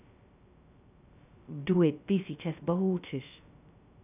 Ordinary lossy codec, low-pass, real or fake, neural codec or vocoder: none; 3.6 kHz; fake; codec, 16 kHz, 0.2 kbps, FocalCodec